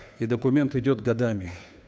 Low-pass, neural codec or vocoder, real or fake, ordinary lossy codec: none; codec, 16 kHz, 2 kbps, FunCodec, trained on Chinese and English, 25 frames a second; fake; none